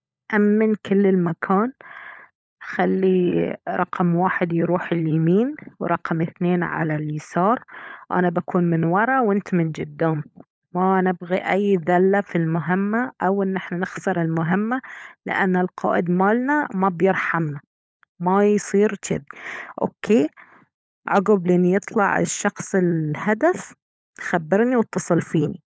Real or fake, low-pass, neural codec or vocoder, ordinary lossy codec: fake; none; codec, 16 kHz, 16 kbps, FunCodec, trained on LibriTTS, 50 frames a second; none